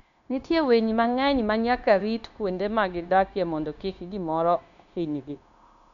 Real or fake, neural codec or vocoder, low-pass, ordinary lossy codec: fake; codec, 16 kHz, 0.9 kbps, LongCat-Audio-Codec; 7.2 kHz; MP3, 96 kbps